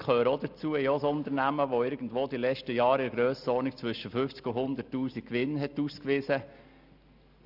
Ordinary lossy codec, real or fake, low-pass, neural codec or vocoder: none; real; 5.4 kHz; none